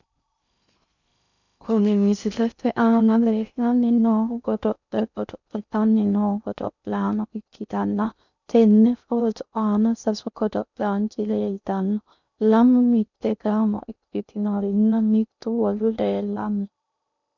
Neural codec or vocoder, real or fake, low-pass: codec, 16 kHz in and 24 kHz out, 0.6 kbps, FocalCodec, streaming, 2048 codes; fake; 7.2 kHz